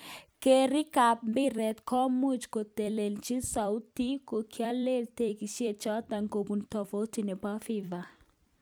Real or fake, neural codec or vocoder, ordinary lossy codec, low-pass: fake; vocoder, 44.1 kHz, 128 mel bands every 256 samples, BigVGAN v2; none; none